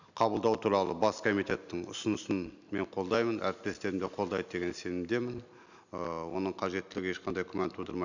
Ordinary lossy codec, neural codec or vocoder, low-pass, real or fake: none; none; 7.2 kHz; real